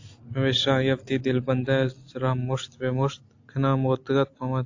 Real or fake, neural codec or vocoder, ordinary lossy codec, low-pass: real; none; AAC, 48 kbps; 7.2 kHz